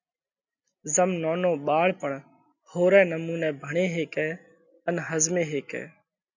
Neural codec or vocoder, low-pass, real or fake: none; 7.2 kHz; real